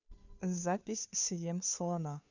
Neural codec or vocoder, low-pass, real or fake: codec, 16 kHz, 2 kbps, FunCodec, trained on Chinese and English, 25 frames a second; 7.2 kHz; fake